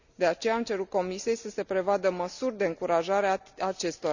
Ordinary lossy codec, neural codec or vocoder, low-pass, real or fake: none; none; 7.2 kHz; real